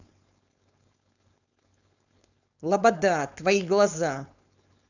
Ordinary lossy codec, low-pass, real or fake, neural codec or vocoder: none; 7.2 kHz; fake; codec, 16 kHz, 4.8 kbps, FACodec